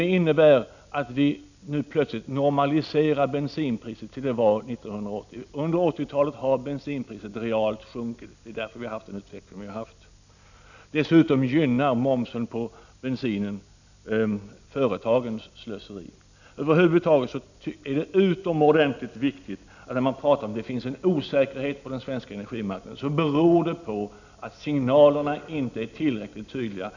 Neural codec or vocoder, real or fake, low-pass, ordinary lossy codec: none; real; 7.2 kHz; none